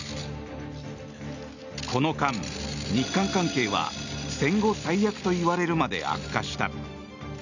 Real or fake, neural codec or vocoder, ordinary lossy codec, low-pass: real; none; none; 7.2 kHz